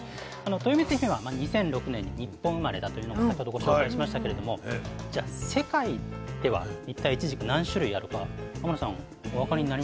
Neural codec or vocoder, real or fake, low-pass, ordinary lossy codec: none; real; none; none